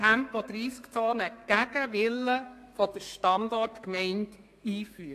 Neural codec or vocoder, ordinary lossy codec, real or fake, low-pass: codec, 32 kHz, 1.9 kbps, SNAC; AAC, 64 kbps; fake; 14.4 kHz